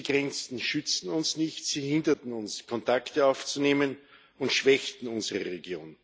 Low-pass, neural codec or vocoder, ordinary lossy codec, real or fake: none; none; none; real